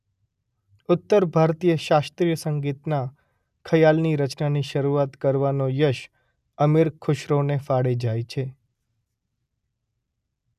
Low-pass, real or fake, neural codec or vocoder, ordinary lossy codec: 14.4 kHz; real; none; none